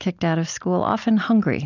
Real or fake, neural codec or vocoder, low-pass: real; none; 7.2 kHz